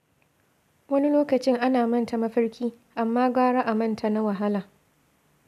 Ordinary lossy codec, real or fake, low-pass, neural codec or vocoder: none; real; 14.4 kHz; none